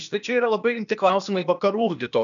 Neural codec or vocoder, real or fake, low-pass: codec, 16 kHz, 0.8 kbps, ZipCodec; fake; 7.2 kHz